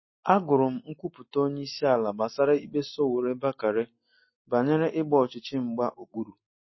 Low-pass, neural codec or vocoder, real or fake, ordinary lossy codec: 7.2 kHz; none; real; MP3, 24 kbps